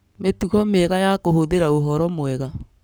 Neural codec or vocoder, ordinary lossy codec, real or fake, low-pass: codec, 44.1 kHz, 7.8 kbps, Pupu-Codec; none; fake; none